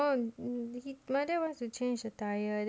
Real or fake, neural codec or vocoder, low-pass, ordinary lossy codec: real; none; none; none